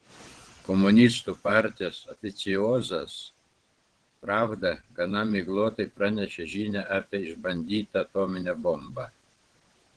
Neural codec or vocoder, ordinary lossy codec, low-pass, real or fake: vocoder, 22.05 kHz, 80 mel bands, WaveNeXt; Opus, 16 kbps; 9.9 kHz; fake